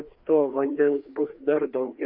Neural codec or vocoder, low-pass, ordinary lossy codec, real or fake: codec, 16 kHz, 2 kbps, FreqCodec, larger model; 5.4 kHz; Opus, 64 kbps; fake